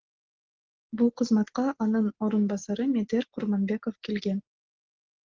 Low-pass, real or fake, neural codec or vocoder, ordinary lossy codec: 7.2 kHz; real; none; Opus, 16 kbps